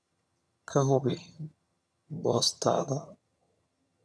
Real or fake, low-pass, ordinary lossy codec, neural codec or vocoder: fake; none; none; vocoder, 22.05 kHz, 80 mel bands, HiFi-GAN